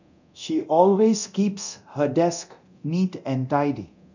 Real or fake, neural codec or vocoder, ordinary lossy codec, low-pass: fake; codec, 24 kHz, 0.9 kbps, DualCodec; none; 7.2 kHz